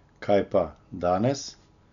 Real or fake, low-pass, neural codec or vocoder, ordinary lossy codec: real; 7.2 kHz; none; none